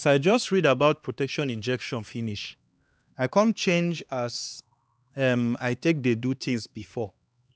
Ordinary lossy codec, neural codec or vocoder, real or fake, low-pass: none; codec, 16 kHz, 2 kbps, X-Codec, HuBERT features, trained on LibriSpeech; fake; none